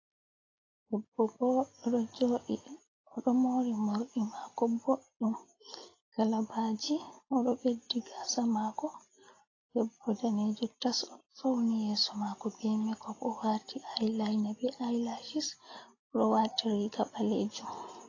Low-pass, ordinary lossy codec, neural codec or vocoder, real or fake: 7.2 kHz; AAC, 32 kbps; none; real